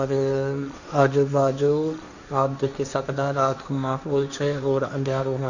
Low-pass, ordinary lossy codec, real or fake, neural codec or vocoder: 7.2 kHz; none; fake; codec, 16 kHz, 1.1 kbps, Voila-Tokenizer